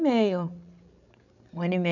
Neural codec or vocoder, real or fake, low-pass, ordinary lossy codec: codec, 16 kHz, 16 kbps, FreqCodec, larger model; fake; 7.2 kHz; none